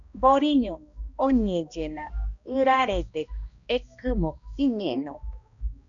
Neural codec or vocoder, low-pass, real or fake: codec, 16 kHz, 1 kbps, X-Codec, HuBERT features, trained on balanced general audio; 7.2 kHz; fake